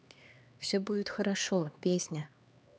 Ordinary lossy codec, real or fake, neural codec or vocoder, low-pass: none; fake; codec, 16 kHz, 2 kbps, X-Codec, HuBERT features, trained on LibriSpeech; none